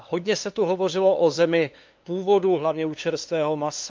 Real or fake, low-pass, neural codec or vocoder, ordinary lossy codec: fake; 7.2 kHz; codec, 16 kHz, 2 kbps, FunCodec, trained on LibriTTS, 25 frames a second; Opus, 24 kbps